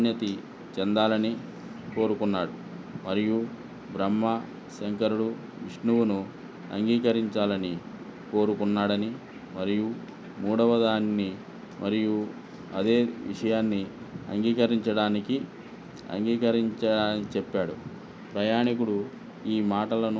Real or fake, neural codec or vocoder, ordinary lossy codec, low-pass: real; none; none; none